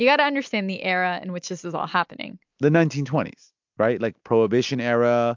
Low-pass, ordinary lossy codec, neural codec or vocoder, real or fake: 7.2 kHz; MP3, 64 kbps; none; real